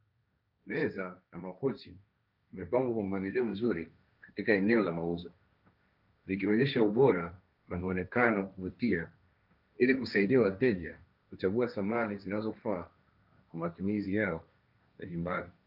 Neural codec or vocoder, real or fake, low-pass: codec, 16 kHz, 1.1 kbps, Voila-Tokenizer; fake; 5.4 kHz